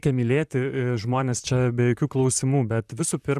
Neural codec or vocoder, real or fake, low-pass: vocoder, 44.1 kHz, 128 mel bands, Pupu-Vocoder; fake; 14.4 kHz